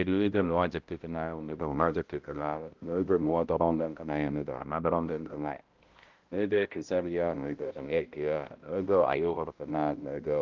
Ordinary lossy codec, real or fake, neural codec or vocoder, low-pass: Opus, 32 kbps; fake; codec, 16 kHz, 0.5 kbps, X-Codec, HuBERT features, trained on balanced general audio; 7.2 kHz